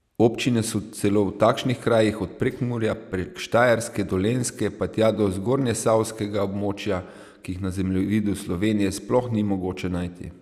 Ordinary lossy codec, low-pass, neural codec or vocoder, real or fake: none; 14.4 kHz; none; real